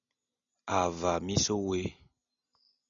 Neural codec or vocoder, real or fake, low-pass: none; real; 7.2 kHz